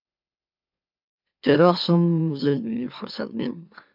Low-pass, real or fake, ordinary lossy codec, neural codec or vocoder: 5.4 kHz; fake; AAC, 48 kbps; autoencoder, 44.1 kHz, a latent of 192 numbers a frame, MeloTTS